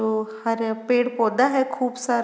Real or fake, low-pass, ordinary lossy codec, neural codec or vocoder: real; none; none; none